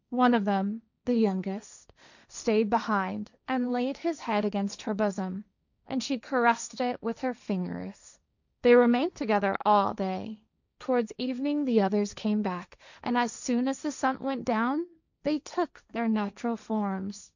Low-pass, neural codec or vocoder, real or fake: 7.2 kHz; codec, 16 kHz, 1.1 kbps, Voila-Tokenizer; fake